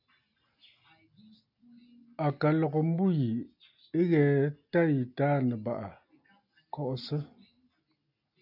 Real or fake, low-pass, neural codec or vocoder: real; 5.4 kHz; none